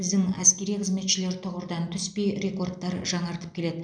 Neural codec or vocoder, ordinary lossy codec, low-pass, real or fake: none; none; 9.9 kHz; real